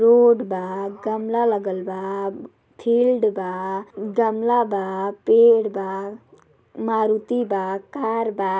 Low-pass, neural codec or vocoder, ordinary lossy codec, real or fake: none; none; none; real